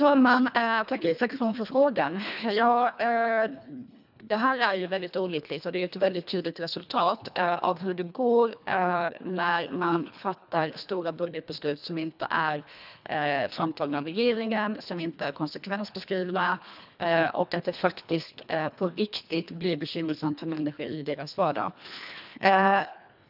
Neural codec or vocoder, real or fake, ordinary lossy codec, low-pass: codec, 24 kHz, 1.5 kbps, HILCodec; fake; none; 5.4 kHz